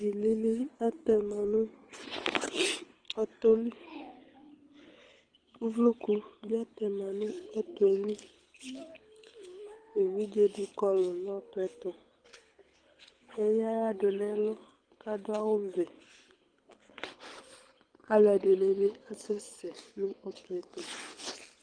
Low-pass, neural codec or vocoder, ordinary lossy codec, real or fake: 9.9 kHz; codec, 24 kHz, 6 kbps, HILCodec; Opus, 24 kbps; fake